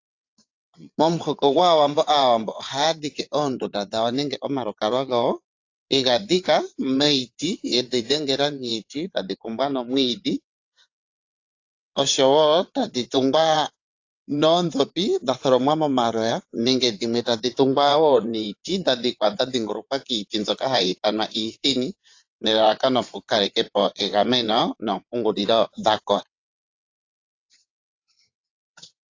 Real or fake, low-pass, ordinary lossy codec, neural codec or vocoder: fake; 7.2 kHz; AAC, 48 kbps; vocoder, 22.05 kHz, 80 mel bands, WaveNeXt